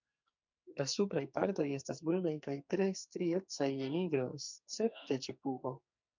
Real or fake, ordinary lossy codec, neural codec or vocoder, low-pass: fake; MP3, 64 kbps; codec, 44.1 kHz, 2.6 kbps, SNAC; 7.2 kHz